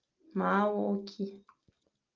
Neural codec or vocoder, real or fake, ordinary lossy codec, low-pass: none; real; Opus, 24 kbps; 7.2 kHz